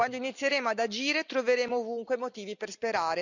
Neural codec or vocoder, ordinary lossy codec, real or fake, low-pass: none; none; real; 7.2 kHz